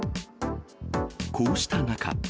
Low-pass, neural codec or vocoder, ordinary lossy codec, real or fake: none; none; none; real